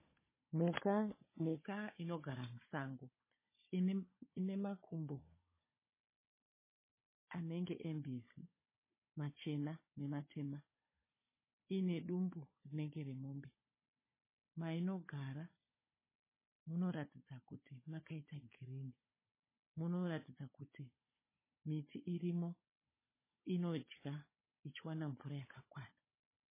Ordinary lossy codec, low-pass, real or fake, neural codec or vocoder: MP3, 16 kbps; 3.6 kHz; fake; codec, 16 kHz, 16 kbps, FunCodec, trained on Chinese and English, 50 frames a second